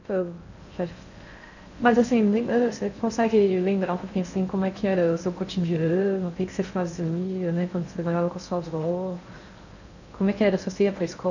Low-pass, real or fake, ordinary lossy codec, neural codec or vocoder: 7.2 kHz; fake; none; codec, 16 kHz in and 24 kHz out, 0.6 kbps, FocalCodec, streaming, 2048 codes